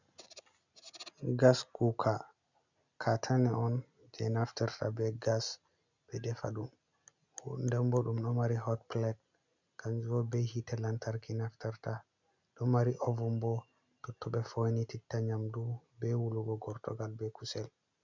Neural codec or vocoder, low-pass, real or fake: none; 7.2 kHz; real